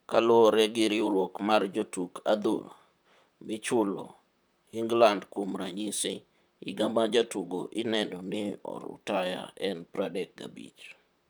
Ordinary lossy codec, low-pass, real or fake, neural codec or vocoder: none; none; fake; vocoder, 44.1 kHz, 128 mel bands, Pupu-Vocoder